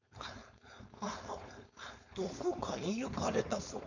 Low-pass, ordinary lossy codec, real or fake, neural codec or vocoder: 7.2 kHz; none; fake; codec, 16 kHz, 4.8 kbps, FACodec